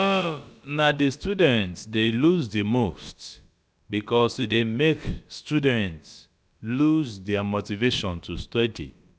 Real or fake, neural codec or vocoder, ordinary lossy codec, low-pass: fake; codec, 16 kHz, about 1 kbps, DyCAST, with the encoder's durations; none; none